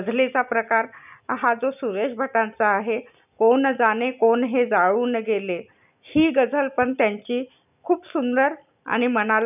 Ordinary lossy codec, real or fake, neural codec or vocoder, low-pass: none; real; none; 3.6 kHz